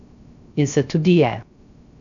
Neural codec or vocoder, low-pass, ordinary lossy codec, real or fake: codec, 16 kHz, 0.3 kbps, FocalCodec; 7.2 kHz; none; fake